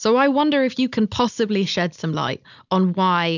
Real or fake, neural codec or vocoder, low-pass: real; none; 7.2 kHz